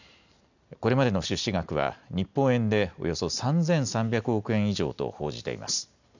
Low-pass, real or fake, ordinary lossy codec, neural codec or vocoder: 7.2 kHz; real; none; none